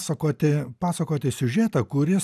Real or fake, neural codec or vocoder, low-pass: real; none; 14.4 kHz